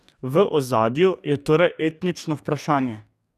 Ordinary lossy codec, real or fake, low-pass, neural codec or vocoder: none; fake; 14.4 kHz; codec, 44.1 kHz, 2.6 kbps, DAC